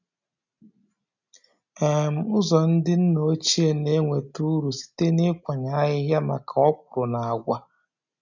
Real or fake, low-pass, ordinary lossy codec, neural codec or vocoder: real; 7.2 kHz; none; none